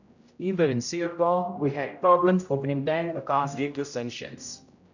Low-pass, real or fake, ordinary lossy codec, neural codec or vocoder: 7.2 kHz; fake; none; codec, 16 kHz, 0.5 kbps, X-Codec, HuBERT features, trained on general audio